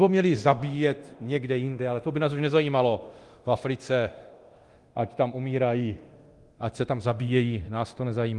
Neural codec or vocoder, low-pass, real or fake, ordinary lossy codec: codec, 24 kHz, 0.9 kbps, DualCodec; 10.8 kHz; fake; Opus, 24 kbps